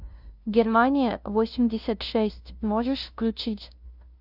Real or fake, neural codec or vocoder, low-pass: fake; codec, 16 kHz, 0.5 kbps, FunCodec, trained on LibriTTS, 25 frames a second; 5.4 kHz